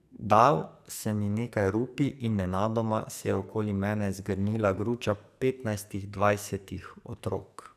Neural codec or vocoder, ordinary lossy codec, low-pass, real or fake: codec, 44.1 kHz, 2.6 kbps, SNAC; none; 14.4 kHz; fake